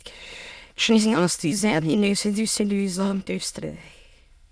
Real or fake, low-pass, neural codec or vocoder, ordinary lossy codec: fake; none; autoencoder, 22.05 kHz, a latent of 192 numbers a frame, VITS, trained on many speakers; none